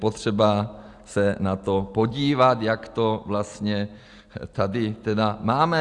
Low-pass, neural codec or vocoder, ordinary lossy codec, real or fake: 10.8 kHz; none; Opus, 64 kbps; real